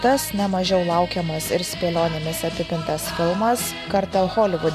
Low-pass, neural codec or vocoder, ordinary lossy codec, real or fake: 14.4 kHz; none; MP3, 96 kbps; real